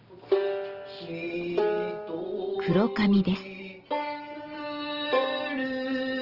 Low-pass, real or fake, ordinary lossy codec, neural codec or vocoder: 5.4 kHz; real; Opus, 16 kbps; none